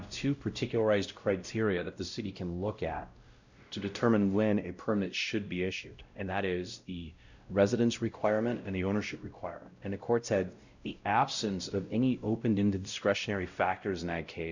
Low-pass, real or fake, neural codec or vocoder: 7.2 kHz; fake; codec, 16 kHz, 0.5 kbps, X-Codec, WavLM features, trained on Multilingual LibriSpeech